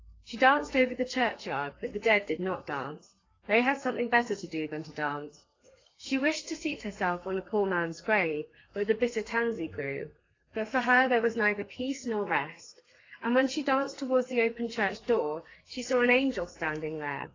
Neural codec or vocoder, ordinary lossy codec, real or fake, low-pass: codec, 32 kHz, 1.9 kbps, SNAC; AAC, 32 kbps; fake; 7.2 kHz